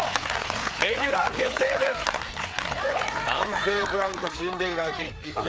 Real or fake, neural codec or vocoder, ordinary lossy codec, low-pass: fake; codec, 16 kHz, 4 kbps, FreqCodec, larger model; none; none